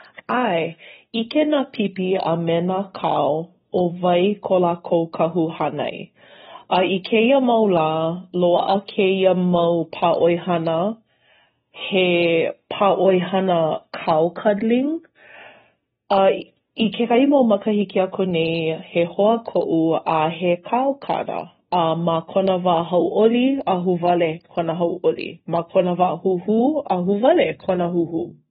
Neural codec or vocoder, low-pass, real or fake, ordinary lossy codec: none; 19.8 kHz; real; AAC, 16 kbps